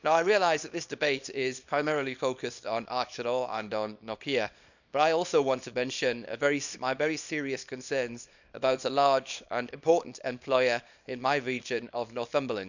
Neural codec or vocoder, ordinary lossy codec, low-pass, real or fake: codec, 24 kHz, 0.9 kbps, WavTokenizer, small release; none; 7.2 kHz; fake